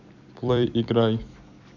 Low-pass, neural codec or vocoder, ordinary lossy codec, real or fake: 7.2 kHz; vocoder, 44.1 kHz, 128 mel bands every 256 samples, BigVGAN v2; none; fake